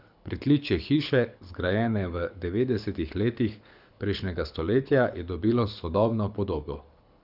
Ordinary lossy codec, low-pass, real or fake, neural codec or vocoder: none; 5.4 kHz; fake; codec, 24 kHz, 6 kbps, HILCodec